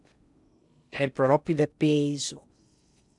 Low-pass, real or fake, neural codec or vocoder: 10.8 kHz; fake; codec, 16 kHz in and 24 kHz out, 0.6 kbps, FocalCodec, streaming, 2048 codes